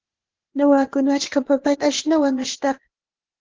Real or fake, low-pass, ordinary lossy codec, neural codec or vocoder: fake; 7.2 kHz; Opus, 16 kbps; codec, 16 kHz, 0.8 kbps, ZipCodec